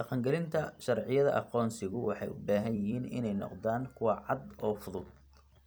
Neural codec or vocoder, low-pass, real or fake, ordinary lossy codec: vocoder, 44.1 kHz, 128 mel bands every 256 samples, BigVGAN v2; none; fake; none